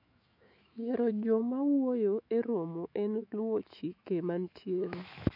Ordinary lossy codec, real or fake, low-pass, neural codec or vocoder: none; fake; 5.4 kHz; autoencoder, 48 kHz, 128 numbers a frame, DAC-VAE, trained on Japanese speech